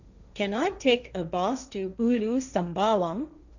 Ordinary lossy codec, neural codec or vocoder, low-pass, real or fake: none; codec, 16 kHz, 1.1 kbps, Voila-Tokenizer; 7.2 kHz; fake